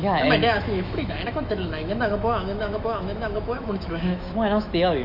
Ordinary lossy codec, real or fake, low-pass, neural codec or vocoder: none; fake; 5.4 kHz; autoencoder, 48 kHz, 128 numbers a frame, DAC-VAE, trained on Japanese speech